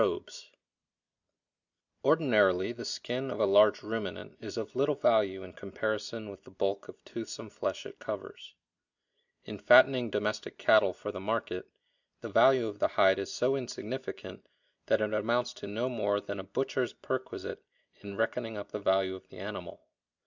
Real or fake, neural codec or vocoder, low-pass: real; none; 7.2 kHz